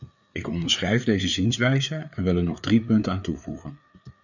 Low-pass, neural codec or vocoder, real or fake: 7.2 kHz; codec, 16 kHz, 4 kbps, FreqCodec, larger model; fake